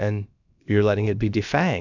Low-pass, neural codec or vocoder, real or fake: 7.2 kHz; codec, 16 kHz, about 1 kbps, DyCAST, with the encoder's durations; fake